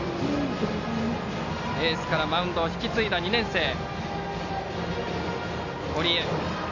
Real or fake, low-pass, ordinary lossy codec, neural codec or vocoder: real; 7.2 kHz; none; none